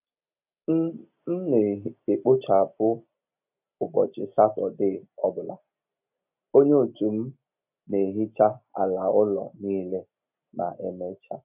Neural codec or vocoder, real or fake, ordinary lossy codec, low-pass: none; real; none; 3.6 kHz